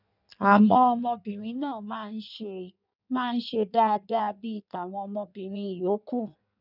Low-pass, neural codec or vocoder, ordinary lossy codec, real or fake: 5.4 kHz; codec, 16 kHz in and 24 kHz out, 1.1 kbps, FireRedTTS-2 codec; none; fake